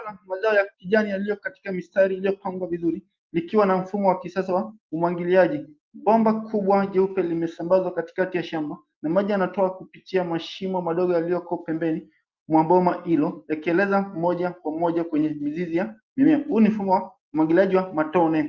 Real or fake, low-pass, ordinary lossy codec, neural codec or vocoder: real; 7.2 kHz; Opus, 32 kbps; none